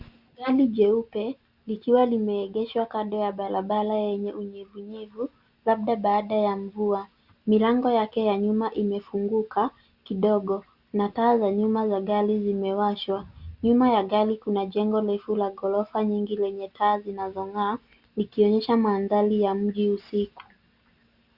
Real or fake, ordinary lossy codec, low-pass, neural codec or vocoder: real; Opus, 64 kbps; 5.4 kHz; none